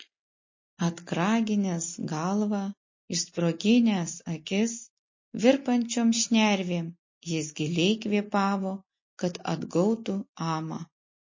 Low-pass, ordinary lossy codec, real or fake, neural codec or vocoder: 7.2 kHz; MP3, 32 kbps; real; none